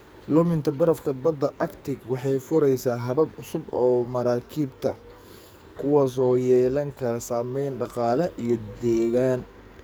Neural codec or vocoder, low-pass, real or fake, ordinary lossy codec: codec, 44.1 kHz, 2.6 kbps, SNAC; none; fake; none